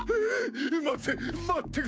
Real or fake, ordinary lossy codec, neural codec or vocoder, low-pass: fake; none; codec, 16 kHz, 6 kbps, DAC; none